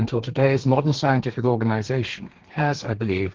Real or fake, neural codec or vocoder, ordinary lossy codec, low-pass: fake; codec, 32 kHz, 1.9 kbps, SNAC; Opus, 16 kbps; 7.2 kHz